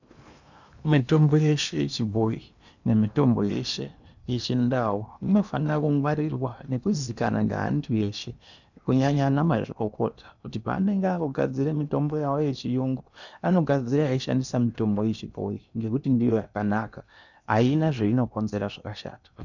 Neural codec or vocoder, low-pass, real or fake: codec, 16 kHz in and 24 kHz out, 0.8 kbps, FocalCodec, streaming, 65536 codes; 7.2 kHz; fake